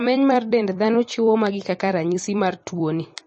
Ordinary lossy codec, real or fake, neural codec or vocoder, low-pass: MP3, 32 kbps; fake; vocoder, 44.1 kHz, 128 mel bands every 256 samples, BigVGAN v2; 10.8 kHz